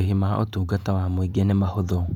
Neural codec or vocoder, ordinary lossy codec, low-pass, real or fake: vocoder, 48 kHz, 128 mel bands, Vocos; none; 19.8 kHz; fake